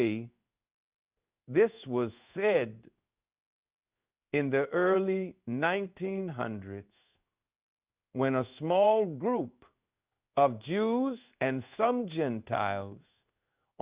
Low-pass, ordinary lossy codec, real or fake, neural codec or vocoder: 3.6 kHz; Opus, 64 kbps; fake; codec, 16 kHz in and 24 kHz out, 1 kbps, XY-Tokenizer